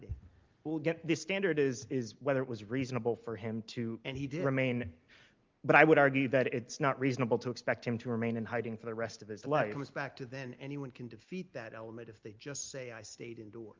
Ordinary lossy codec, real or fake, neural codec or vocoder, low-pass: Opus, 24 kbps; real; none; 7.2 kHz